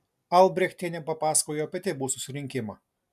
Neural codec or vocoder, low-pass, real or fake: none; 14.4 kHz; real